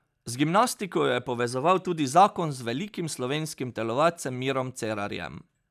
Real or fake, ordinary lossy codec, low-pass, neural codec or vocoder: real; none; 14.4 kHz; none